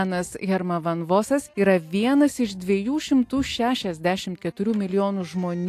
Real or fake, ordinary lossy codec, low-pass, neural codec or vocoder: real; AAC, 64 kbps; 14.4 kHz; none